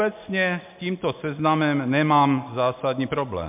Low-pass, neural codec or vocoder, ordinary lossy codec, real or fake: 3.6 kHz; none; MP3, 32 kbps; real